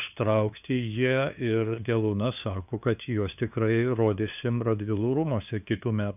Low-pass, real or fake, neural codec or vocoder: 3.6 kHz; fake; codec, 16 kHz, 2 kbps, FunCodec, trained on LibriTTS, 25 frames a second